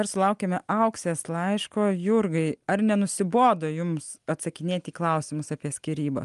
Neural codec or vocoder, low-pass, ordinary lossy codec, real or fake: none; 10.8 kHz; Opus, 32 kbps; real